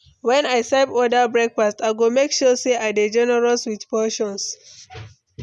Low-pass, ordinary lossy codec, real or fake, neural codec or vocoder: none; none; real; none